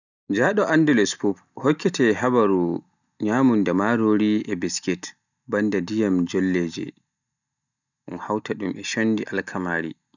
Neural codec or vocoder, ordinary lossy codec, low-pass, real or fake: none; none; 7.2 kHz; real